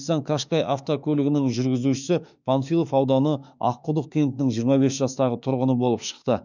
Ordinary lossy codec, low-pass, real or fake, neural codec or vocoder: none; 7.2 kHz; fake; autoencoder, 48 kHz, 32 numbers a frame, DAC-VAE, trained on Japanese speech